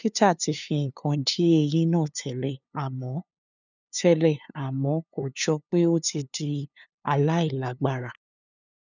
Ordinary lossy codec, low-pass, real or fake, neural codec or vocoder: none; 7.2 kHz; fake; codec, 16 kHz, 2 kbps, FunCodec, trained on LibriTTS, 25 frames a second